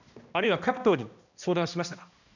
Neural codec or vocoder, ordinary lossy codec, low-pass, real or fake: codec, 16 kHz, 1 kbps, X-Codec, HuBERT features, trained on balanced general audio; none; 7.2 kHz; fake